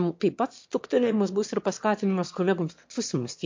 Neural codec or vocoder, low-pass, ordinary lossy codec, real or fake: autoencoder, 22.05 kHz, a latent of 192 numbers a frame, VITS, trained on one speaker; 7.2 kHz; MP3, 48 kbps; fake